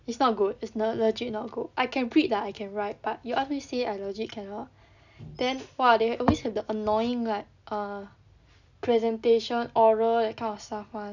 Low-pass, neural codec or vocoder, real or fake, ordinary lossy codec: 7.2 kHz; none; real; none